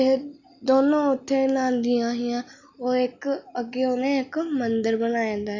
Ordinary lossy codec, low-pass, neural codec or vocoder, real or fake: Opus, 64 kbps; 7.2 kHz; none; real